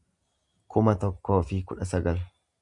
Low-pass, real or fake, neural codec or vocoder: 10.8 kHz; real; none